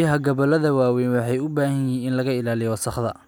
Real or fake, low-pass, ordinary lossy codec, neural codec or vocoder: real; none; none; none